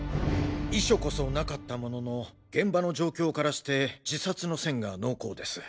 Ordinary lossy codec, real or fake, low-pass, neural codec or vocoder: none; real; none; none